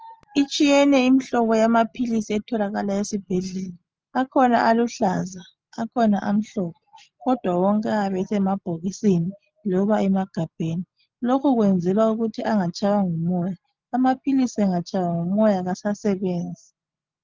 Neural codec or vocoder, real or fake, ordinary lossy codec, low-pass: none; real; Opus, 24 kbps; 7.2 kHz